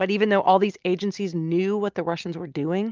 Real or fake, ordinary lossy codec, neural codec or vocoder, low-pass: real; Opus, 32 kbps; none; 7.2 kHz